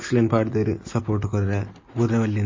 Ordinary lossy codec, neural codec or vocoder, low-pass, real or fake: MP3, 32 kbps; none; 7.2 kHz; real